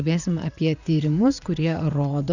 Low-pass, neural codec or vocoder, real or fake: 7.2 kHz; vocoder, 44.1 kHz, 80 mel bands, Vocos; fake